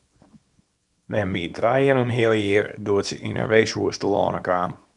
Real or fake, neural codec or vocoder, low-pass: fake; codec, 24 kHz, 0.9 kbps, WavTokenizer, small release; 10.8 kHz